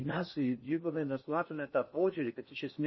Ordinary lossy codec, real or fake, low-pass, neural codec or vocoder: MP3, 24 kbps; fake; 7.2 kHz; codec, 16 kHz in and 24 kHz out, 0.6 kbps, FocalCodec, streaming, 4096 codes